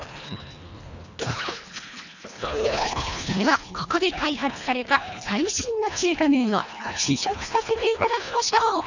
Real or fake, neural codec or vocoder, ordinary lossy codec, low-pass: fake; codec, 24 kHz, 1.5 kbps, HILCodec; none; 7.2 kHz